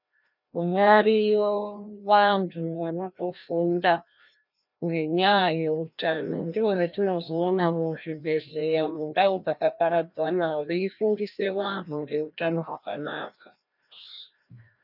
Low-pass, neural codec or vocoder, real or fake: 5.4 kHz; codec, 16 kHz, 1 kbps, FreqCodec, larger model; fake